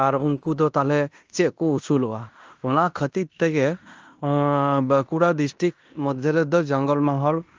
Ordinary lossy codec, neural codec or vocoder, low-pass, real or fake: Opus, 24 kbps; codec, 16 kHz in and 24 kHz out, 0.9 kbps, LongCat-Audio-Codec, fine tuned four codebook decoder; 7.2 kHz; fake